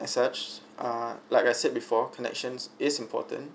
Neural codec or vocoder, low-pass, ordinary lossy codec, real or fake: none; none; none; real